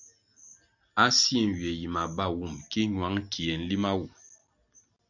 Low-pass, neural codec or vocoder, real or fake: 7.2 kHz; none; real